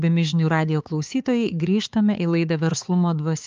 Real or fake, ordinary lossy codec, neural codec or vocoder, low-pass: fake; Opus, 24 kbps; codec, 16 kHz, 4 kbps, X-Codec, HuBERT features, trained on balanced general audio; 7.2 kHz